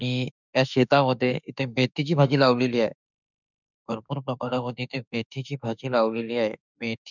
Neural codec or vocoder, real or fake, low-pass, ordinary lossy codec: autoencoder, 48 kHz, 32 numbers a frame, DAC-VAE, trained on Japanese speech; fake; 7.2 kHz; none